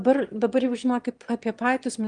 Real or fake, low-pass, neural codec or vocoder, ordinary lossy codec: fake; 9.9 kHz; autoencoder, 22.05 kHz, a latent of 192 numbers a frame, VITS, trained on one speaker; Opus, 24 kbps